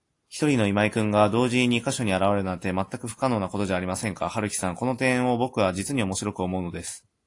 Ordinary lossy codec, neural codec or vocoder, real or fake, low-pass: AAC, 48 kbps; none; real; 10.8 kHz